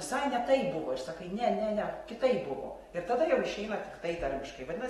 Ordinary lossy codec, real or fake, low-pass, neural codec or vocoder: AAC, 32 kbps; real; 19.8 kHz; none